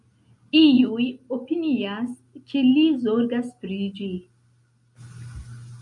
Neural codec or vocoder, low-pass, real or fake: none; 10.8 kHz; real